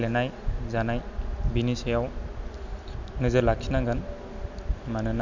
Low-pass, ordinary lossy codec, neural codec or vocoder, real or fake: 7.2 kHz; none; none; real